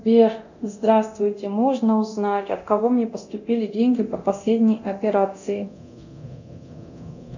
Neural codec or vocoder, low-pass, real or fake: codec, 24 kHz, 0.9 kbps, DualCodec; 7.2 kHz; fake